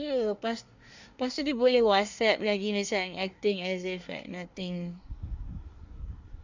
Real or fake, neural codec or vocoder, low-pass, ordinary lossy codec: fake; codec, 24 kHz, 1 kbps, SNAC; 7.2 kHz; Opus, 64 kbps